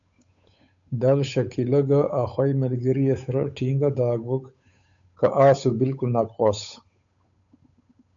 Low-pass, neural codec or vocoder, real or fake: 7.2 kHz; codec, 16 kHz, 8 kbps, FunCodec, trained on Chinese and English, 25 frames a second; fake